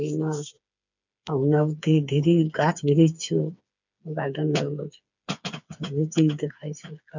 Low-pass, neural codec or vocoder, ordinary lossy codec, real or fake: 7.2 kHz; codec, 16 kHz, 4 kbps, FreqCodec, smaller model; none; fake